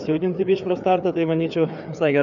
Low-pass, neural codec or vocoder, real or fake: 7.2 kHz; codec, 16 kHz, 4 kbps, FreqCodec, larger model; fake